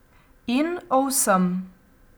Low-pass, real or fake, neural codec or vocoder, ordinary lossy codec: none; real; none; none